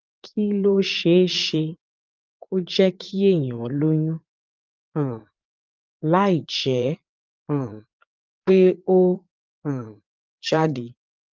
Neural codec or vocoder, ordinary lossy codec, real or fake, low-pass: vocoder, 22.05 kHz, 80 mel bands, Vocos; Opus, 32 kbps; fake; 7.2 kHz